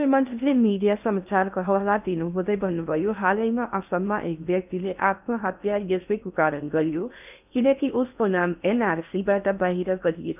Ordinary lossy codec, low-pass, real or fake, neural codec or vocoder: none; 3.6 kHz; fake; codec, 16 kHz in and 24 kHz out, 0.6 kbps, FocalCodec, streaming, 2048 codes